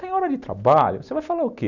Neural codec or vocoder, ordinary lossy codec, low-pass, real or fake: none; none; 7.2 kHz; real